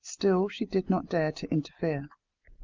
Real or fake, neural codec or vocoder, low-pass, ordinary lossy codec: real; none; 7.2 kHz; Opus, 24 kbps